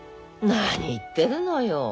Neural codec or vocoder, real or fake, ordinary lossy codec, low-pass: none; real; none; none